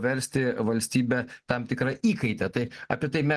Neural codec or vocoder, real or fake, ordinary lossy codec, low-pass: none; real; Opus, 16 kbps; 10.8 kHz